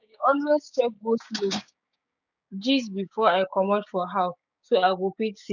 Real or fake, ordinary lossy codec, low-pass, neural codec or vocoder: fake; none; 7.2 kHz; codec, 16 kHz, 6 kbps, DAC